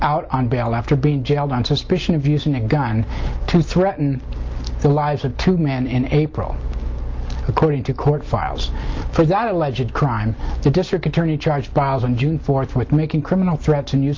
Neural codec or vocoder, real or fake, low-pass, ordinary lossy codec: none; real; 7.2 kHz; Opus, 24 kbps